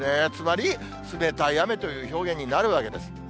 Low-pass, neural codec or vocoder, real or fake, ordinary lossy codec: none; none; real; none